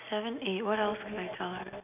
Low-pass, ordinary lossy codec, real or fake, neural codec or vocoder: 3.6 kHz; none; real; none